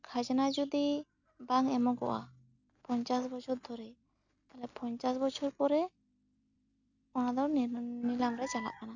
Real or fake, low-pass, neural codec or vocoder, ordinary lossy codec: real; 7.2 kHz; none; none